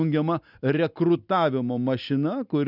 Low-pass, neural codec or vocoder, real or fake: 5.4 kHz; none; real